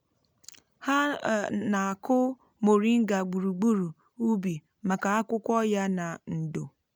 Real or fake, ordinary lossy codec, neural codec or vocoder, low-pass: real; none; none; none